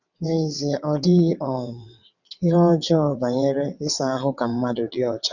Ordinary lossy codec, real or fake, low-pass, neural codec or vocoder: none; fake; 7.2 kHz; vocoder, 22.05 kHz, 80 mel bands, WaveNeXt